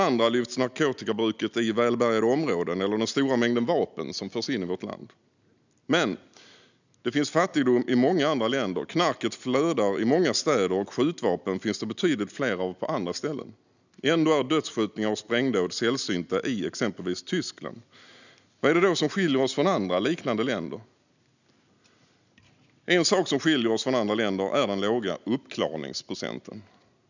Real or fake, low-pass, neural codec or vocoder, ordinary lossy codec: real; 7.2 kHz; none; none